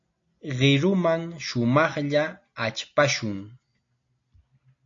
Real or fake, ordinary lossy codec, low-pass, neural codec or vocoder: real; AAC, 48 kbps; 7.2 kHz; none